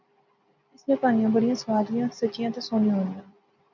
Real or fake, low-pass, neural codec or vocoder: real; 7.2 kHz; none